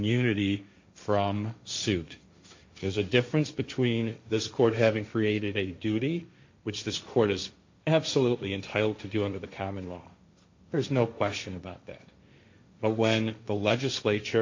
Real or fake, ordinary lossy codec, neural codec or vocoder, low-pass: fake; MP3, 48 kbps; codec, 16 kHz, 1.1 kbps, Voila-Tokenizer; 7.2 kHz